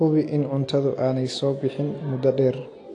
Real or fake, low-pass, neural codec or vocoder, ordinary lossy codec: real; 10.8 kHz; none; AAC, 48 kbps